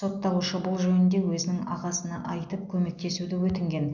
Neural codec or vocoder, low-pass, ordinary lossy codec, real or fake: none; 7.2 kHz; none; real